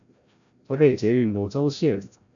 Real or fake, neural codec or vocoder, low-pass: fake; codec, 16 kHz, 0.5 kbps, FreqCodec, larger model; 7.2 kHz